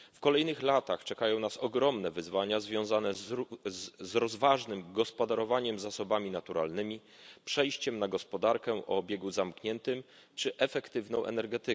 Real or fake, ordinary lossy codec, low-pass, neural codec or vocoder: real; none; none; none